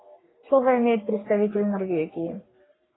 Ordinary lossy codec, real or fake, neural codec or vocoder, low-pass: AAC, 16 kbps; fake; codec, 44.1 kHz, 3.4 kbps, Pupu-Codec; 7.2 kHz